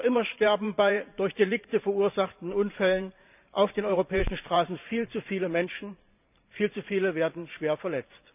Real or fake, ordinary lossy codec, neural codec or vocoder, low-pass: fake; none; vocoder, 44.1 kHz, 128 mel bands every 512 samples, BigVGAN v2; 3.6 kHz